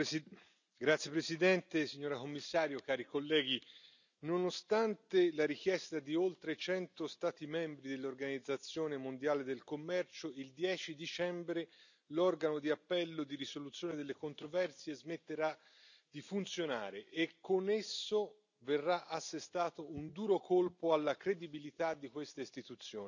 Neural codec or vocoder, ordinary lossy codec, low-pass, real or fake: none; none; 7.2 kHz; real